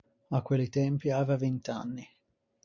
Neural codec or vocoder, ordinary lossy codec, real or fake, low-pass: none; MP3, 64 kbps; real; 7.2 kHz